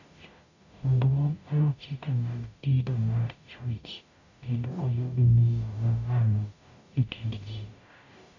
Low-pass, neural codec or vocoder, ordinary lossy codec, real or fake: 7.2 kHz; codec, 44.1 kHz, 0.9 kbps, DAC; none; fake